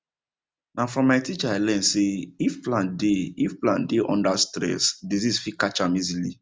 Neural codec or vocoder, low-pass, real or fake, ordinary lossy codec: none; none; real; none